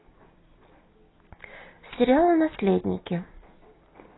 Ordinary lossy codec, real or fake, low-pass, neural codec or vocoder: AAC, 16 kbps; real; 7.2 kHz; none